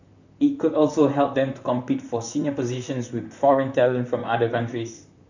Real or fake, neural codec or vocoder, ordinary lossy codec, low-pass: fake; vocoder, 44.1 kHz, 128 mel bands, Pupu-Vocoder; none; 7.2 kHz